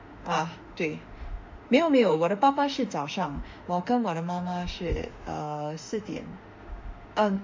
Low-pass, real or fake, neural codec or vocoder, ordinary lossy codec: 7.2 kHz; fake; autoencoder, 48 kHz, 32 numbers a frame, DAC-VAE, trained on Japanese speech; MP3, 48 kbps